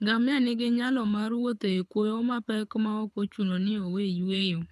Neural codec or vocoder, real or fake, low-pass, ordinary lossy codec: codec, 24 kHz, 6 kbps, HILCodec; fake; none; none